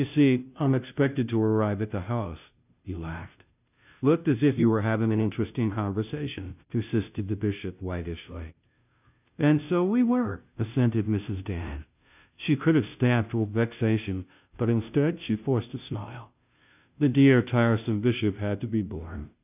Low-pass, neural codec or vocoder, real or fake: 3.6 kHz; codec, 16 kHz, 0.5 kbps, FunCodec, trained on Chinese and English, 25 frames a second; fake